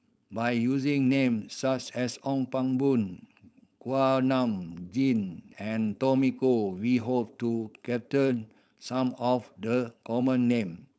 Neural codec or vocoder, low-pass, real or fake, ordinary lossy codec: codec, 16 kHz, 4.8 kbps, FACodec; none; fake; none